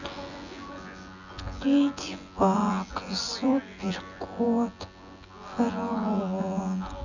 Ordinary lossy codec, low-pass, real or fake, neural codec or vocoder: none; 7.2 kHz; fake; vocoder, 24 kHz, 100 mel bands, Vocos